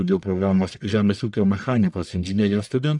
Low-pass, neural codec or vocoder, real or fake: 10.8 kHz; codec, 44.1 kHz, 1.7 kbps, Pupu-Codec; fake